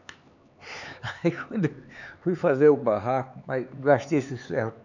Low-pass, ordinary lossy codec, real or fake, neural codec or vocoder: 7.2 kHz; none; fake; codec, 16 kHz, 4 kbps, X-Codec, HuBERT features, trained on LibriSpeech